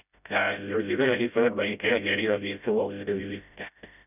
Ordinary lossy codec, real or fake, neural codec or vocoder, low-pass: none; fake; codec, 16 kHz, 0.5 kbps, FreqCodec, smaller model; 3.6 kHz